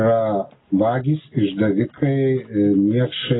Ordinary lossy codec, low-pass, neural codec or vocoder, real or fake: AAC, 16 kbps; 7.2 kHz; none; real